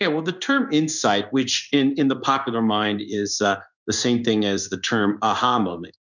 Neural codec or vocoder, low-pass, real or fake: codec, 16 kHz in and 24 kHz out, 1 kbps, XY-Tokenizer; 7.2 kHz; fake